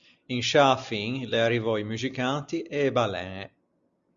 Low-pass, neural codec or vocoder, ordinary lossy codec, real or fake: 7.2 kHz; none; Opus, 64 kbps; real